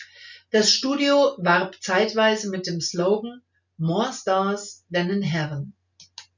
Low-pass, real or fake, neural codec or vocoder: 7.2 kHz; real; none